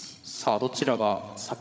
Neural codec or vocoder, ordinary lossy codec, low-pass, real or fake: codec, 16 kHz, 16 kbps, FreqCodec, larger model; none; none; fake